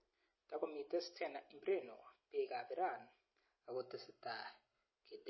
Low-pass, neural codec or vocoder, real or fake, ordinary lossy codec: 7.2 kHz; none; real; MP3, 24 kbps